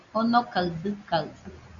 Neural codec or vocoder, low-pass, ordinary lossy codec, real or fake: none; 7.2 kHz; Opus, 64 kbps; real